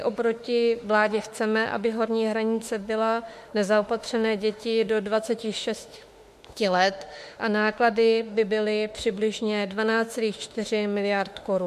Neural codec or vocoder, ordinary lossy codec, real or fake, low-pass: autoencoder, 48 kHz, 32 numbers a frame, DAC-VAE, trained on Japanese speech; MP3, 64 kbps; fake; 14.4 kHz